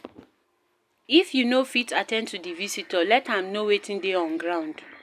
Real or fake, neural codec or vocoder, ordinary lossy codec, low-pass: real; none; none; 14.4 kHz